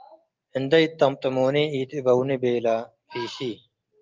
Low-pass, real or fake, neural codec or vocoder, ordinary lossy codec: 7.2 kHz; real; none; Opus, 24 kbps